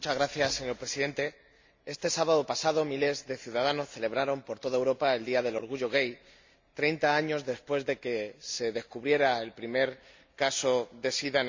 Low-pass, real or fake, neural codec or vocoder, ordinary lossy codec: 7.2 kHz; real; none; MP3, 64 kbps